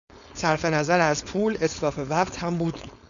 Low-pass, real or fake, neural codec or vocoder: 7.2 kHz; fake; codec, 16 kHz, 4.8 kbps, FACodec